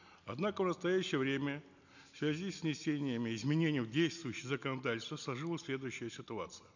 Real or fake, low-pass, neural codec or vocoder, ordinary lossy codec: real; 7.2 kHz; none; none